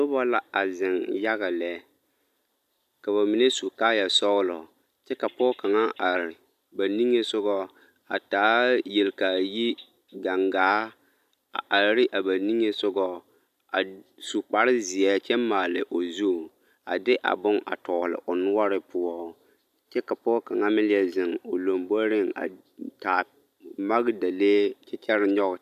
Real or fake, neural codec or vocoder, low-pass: real; none; 14.4 kHz